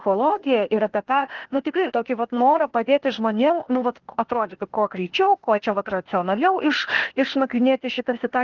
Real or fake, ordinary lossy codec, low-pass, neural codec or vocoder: fake; Opus, 16 kbps; 7.2 kHz; codec, 16 kHz, 0.8 kbps, ZipCodec